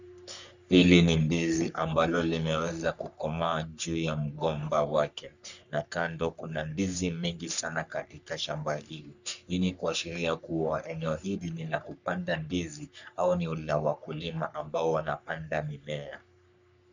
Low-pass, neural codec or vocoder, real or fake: 7.2 kHz; codec, 44.1 kHz, 3.4 kbps, Pupu-Codec; fake